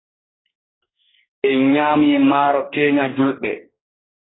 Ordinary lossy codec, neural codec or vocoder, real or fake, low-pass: AAC, 16 kbps; codec, 44.1 kHz, 2.6 kbps, DAC; fake; 7.2 kHz